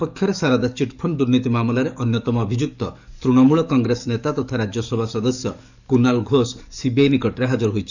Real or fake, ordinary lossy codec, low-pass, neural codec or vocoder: fake; none; 7.2 kHz; codec, 44.1 kHz, 7.8 kbps, DAC